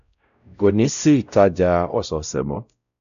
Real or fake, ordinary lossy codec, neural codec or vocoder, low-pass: fake; none; codec, 16 kHz, 0.5 kbps, X-Codec, WavLM features, trained on Multilingual LibriSpeech; 7.2 kHz